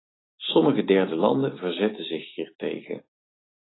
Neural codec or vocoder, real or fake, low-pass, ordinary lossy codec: none; real; 7.2 kHz; AAC, 16 kbps